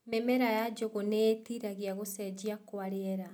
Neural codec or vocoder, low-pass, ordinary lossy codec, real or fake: none; none; none; real